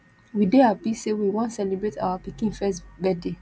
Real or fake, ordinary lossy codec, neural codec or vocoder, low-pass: real; none; none; none